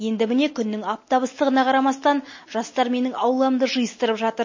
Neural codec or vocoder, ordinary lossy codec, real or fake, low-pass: none; MP3, 32 kbps; real; 7.2 kHz